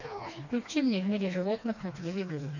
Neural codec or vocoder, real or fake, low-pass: codec, 16 kHz, 2 kbps, FreqCodec, smaller model; fake; 7.2 kHz